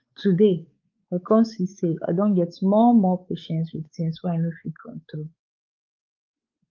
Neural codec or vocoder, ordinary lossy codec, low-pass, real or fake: codec, 16 kHz, 4 kbps, X-Codec, WavLM features, trained on Multilingual LibriSpeech; Opus, 24 kbps; 7.2 kHz; fake